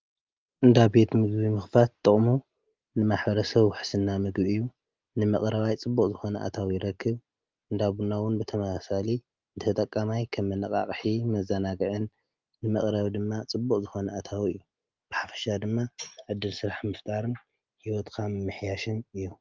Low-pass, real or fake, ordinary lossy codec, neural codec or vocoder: 7.2 kHz; real; Opus, 32 kbps; none